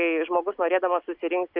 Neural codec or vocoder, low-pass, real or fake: none; 5.4 kHz; real